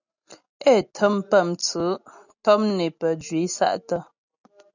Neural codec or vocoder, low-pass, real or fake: none; 7.2 kHz; real